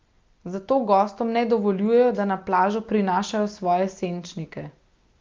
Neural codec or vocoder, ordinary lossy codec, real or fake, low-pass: none; Opus, 24 kbps; real; 7.2 kHz